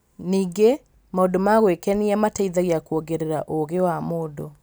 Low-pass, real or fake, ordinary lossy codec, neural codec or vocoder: none; real; none; none